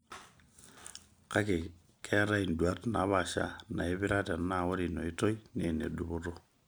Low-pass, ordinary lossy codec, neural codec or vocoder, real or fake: none; none; none; real